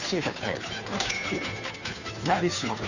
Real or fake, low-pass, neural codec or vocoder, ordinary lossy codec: fake; 7.2 kHz; codec, 16 kHz, 2 kbps, FunCodec, trained on Chinese and English, 25 frames a second; none